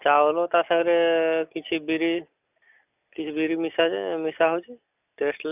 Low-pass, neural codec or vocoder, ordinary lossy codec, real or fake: 3.6 kHz; none; none; real